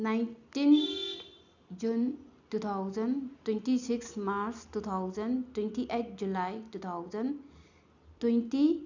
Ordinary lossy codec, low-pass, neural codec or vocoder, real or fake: none; 7.2 kHz; none; real